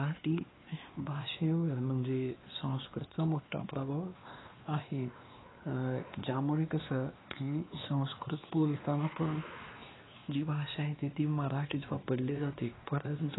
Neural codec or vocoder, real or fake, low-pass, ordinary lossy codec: codec, 16 kHz, 2 kbps, X-Codec, WavLM features, trained on Multilingual LibriSpeech; fake; 7.2 kHz; AAC, 16 kbps